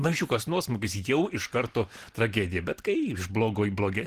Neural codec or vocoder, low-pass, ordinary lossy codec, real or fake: none; 14.4 kHz; Opus, 16 kbps; real